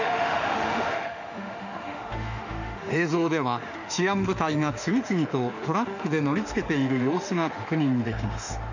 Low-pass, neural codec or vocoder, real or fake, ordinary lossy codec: 7.2 kHz; autoencoder, 48 kHz, 32 numbers a frame, DAC-VAE, trained on Japanese speech; fake; none